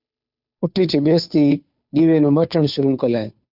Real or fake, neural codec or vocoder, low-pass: fake; codec, 16 kHz, 2 kbps, FunCodec, trained on Chinese and English, 25 frames a second; 5.4 kHz